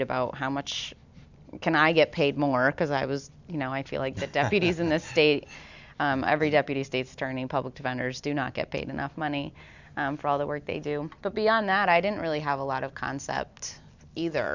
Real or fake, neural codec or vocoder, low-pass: real; none; 7.2 kHz